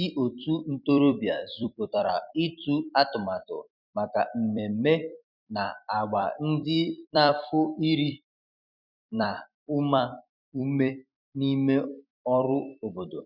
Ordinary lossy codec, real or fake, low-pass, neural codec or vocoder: none; fake; 5.4 kHz; vocoder, 44.1 kHz, 128 mel bands every 256 samples, BigVGAN v2